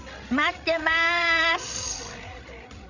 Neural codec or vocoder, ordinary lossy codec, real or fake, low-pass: codec, 16 kHz, 16 kbps, FreqCodec, larger model; none; fake; 7.2 kHz